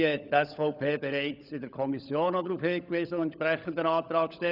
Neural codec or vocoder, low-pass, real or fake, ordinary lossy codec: codec, 16 kHz, 16 kbps, FunCodec, trained on LibriTTS, 50 frames a second; 5.4 kHz; fake; none